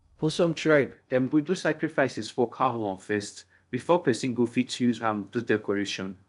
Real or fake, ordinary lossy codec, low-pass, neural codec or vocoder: fake; none; 10.8 kHz; codec, 16 kHz in and 24 kHz out, 0.6 kbps, FocalCodec, streaming, 2048 codes